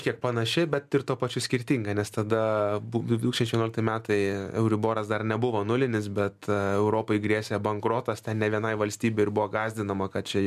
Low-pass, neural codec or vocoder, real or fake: 14.4 kHz; none; real